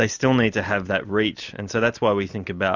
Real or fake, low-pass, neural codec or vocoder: real; 7.2 kHz; none